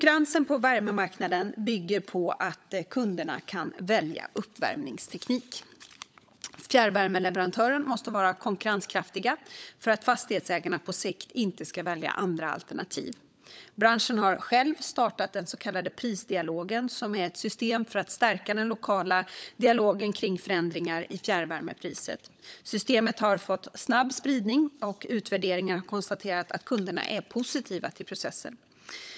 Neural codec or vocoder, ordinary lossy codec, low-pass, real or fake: codec, 16 kHz, 16 kbps, FunCodec, trained on LibriTTS, 50 frames a second; none; none; fake